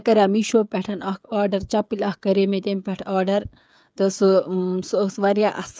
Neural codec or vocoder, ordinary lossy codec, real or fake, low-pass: codec, 16 kHz, 16 kbps, FreqCodec, smaller model; none; fake; none